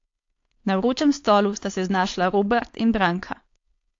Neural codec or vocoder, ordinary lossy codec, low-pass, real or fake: codec, 16 kHz, 4.8 kbps, FACodec; AAC, 48 kbps; 7.2 kHz; fake